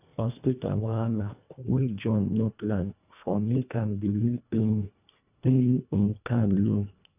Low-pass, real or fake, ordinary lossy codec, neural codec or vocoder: 3.6 kHz; fake; none; codec, 24 kHz, 1.5 kbps, HILCodec